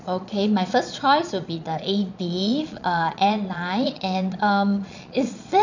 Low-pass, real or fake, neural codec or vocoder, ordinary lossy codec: 7.2 kHz; fake; vocoder, 22.05 kHz, 80 mel bands, Vocos; none